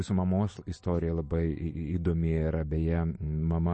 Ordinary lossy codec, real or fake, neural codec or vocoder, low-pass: MP3, 32 kbps; fake; vocoder, 44.1 kHz, 128 mel bands every 256 samples, BigVGAN v2; 10.8 kHz